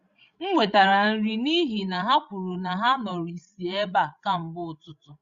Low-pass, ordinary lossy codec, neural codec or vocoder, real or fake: 7.2 kHz; Opus, 64 kbps; codec, 16 kHz, 16 kbps, FreqCodec, larger model; fake